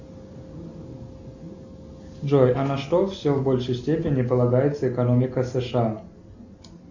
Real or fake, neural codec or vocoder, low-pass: real; none; 7.2 kHz